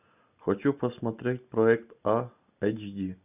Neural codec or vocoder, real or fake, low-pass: none; real; 3.6 kHz